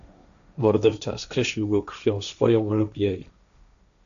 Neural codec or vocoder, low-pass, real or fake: codec, 16 kHz, 1.1 kbps, Voila-Tokenizer; 7.2 kHz; fake